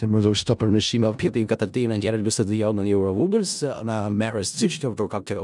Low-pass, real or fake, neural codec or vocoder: 10.8 kHz; fake; codec, 16 kHz in and 24 kHz out, 0.4 kbps, LongCat-Audio-Codec, four codebook decoder